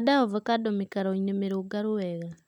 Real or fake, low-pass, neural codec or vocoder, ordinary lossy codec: real; 19.8 kHz; none; none